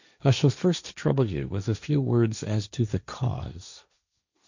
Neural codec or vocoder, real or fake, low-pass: codec, 16 kHz, 1.1 kbps, Voila-Tokenizer; fake; 7.2 kHz